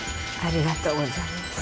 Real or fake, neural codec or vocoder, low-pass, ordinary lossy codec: real; none; none; none